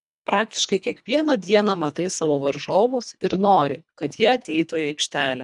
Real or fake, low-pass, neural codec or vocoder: fake; 10.8 kHz; codec, 24 kHz, 1.5 kbps, HILCodec